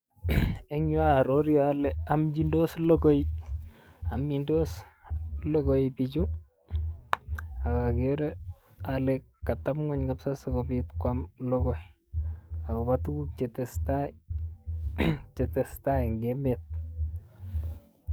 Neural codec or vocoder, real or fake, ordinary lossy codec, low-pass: codec, 44.1 kHz, 7.8 kbps, DAC; fake; none; none